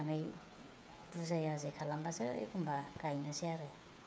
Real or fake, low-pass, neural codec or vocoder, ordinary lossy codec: fake; none; codec, 16 kHz, 8 kbps, FreqCodec, smaller model; none